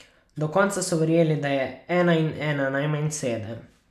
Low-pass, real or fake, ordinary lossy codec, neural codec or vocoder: 14.4 kHz; real; none; none